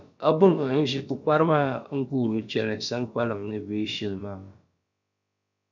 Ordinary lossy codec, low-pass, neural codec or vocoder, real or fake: MP3, 64 kbps; 7.2 kHz; codec, 16 kHz, about 1 kbps, DyCAST, with the encoder's durations; fake